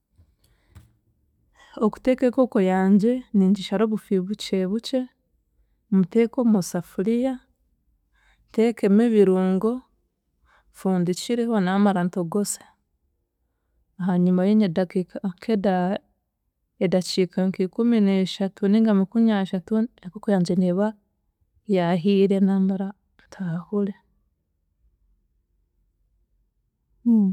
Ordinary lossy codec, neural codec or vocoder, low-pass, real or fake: none; none; 19.8 kHz; real